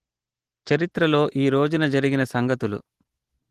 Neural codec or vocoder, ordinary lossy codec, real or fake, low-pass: none; Opus, 16 kbps; real; 14.4 kHz